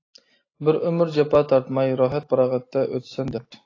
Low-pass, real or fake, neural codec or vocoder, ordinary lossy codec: 7.2 kHz; real; none; AAC, 32 kbps